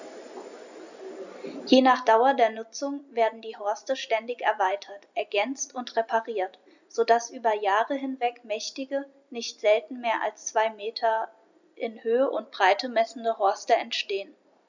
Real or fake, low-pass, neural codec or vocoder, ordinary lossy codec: real; 7.2 kHz; none; none